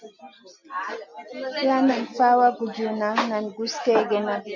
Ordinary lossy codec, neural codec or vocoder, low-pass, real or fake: MP3, 48 kbps; none; 7.2 kHz; real